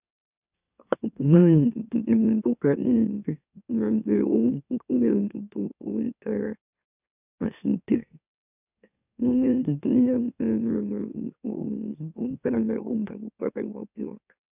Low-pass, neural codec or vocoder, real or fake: 3.6 kHz; autoencoder, 44.1 kHz, a latent of 192 numbers a frame, MeloTTS; fake